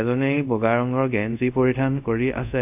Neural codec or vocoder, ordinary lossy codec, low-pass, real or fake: codec, 24 kHz, 0.5 kbps, DualCodec; none; 3.6 kHz; fake